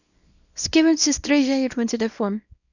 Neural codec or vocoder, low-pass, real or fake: codec, 24 kHz, 0.9 kbps, WavTokenizer, small release; 7.2 kHz; fake